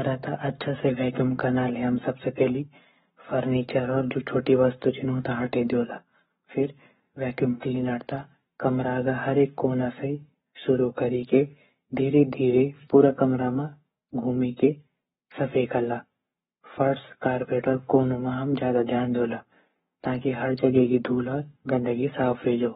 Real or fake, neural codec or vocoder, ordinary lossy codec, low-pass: fake; codec, 44.1 kHz, 7.8 kbps, Pupu-Codec; AAC, 16 kbps; 19.8 kHz